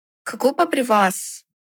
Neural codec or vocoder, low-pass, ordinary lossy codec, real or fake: codec, 44.1 kHz, 7.8 kbps, Pupu-Codec; none; none; fake